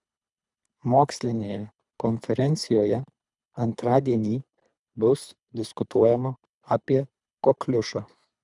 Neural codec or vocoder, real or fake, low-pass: codec, 24 kHz, 3 kbps, HILCodec; fake; 10.8 kHz